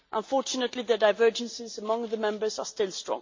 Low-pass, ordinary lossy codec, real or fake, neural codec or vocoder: 7.2 kHz; MP3, 48 kbps; real; none